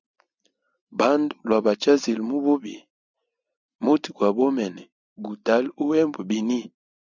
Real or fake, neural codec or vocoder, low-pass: real; none; 7.2 kHz